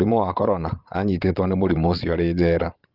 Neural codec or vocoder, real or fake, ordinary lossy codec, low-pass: vocoder, 22.05 kHz, 80 mel bands, Vocos; fake; Opus, 32 kbps; 5.4 kHz